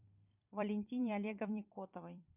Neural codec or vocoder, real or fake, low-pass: vocoder, 44.1 kHz, 128 mel bands every 256 samples, BigVGAN v2; fake; 3.6 kHz